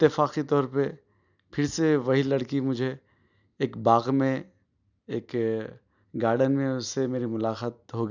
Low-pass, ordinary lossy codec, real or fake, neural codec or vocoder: 7.2 kHz; none; real; none